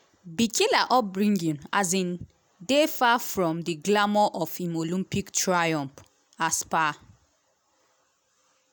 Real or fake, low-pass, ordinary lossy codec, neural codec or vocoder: real; none; none; none